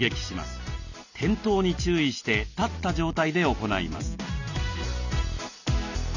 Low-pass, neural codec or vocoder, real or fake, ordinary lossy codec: 7.2 kHz; none; real; none